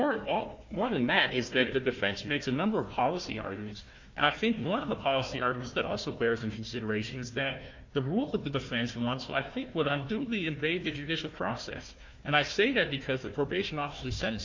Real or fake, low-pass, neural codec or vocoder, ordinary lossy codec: fake; 7.2 kHz; codec, 16 kHz, 1 kbps, FunCodec, trained on Chinese and English, 50 frames a second; MP3, 48 kbps